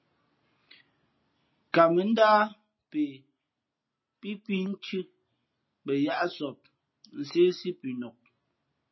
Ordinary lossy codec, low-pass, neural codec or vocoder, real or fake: MP3, 24 kbps; 7.2 kHz; none; real